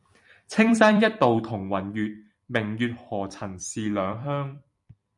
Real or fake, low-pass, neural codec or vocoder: fake; 10.8 kHz; vocoder, 44.1 kHz, 128 mel bands every 256 samples, BigVGAN v2